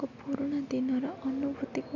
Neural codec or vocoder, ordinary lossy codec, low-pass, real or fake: none; none; 7.2 kHz; real